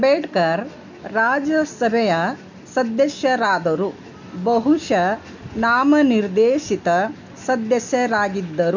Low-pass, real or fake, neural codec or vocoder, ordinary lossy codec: 7.2 kHz; real; none; none